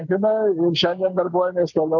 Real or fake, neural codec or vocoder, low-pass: real; none; 7.2 kHz